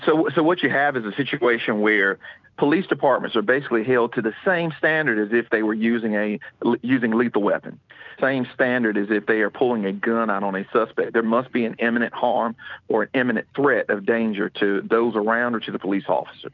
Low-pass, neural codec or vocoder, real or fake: 7.2 kHz; none; real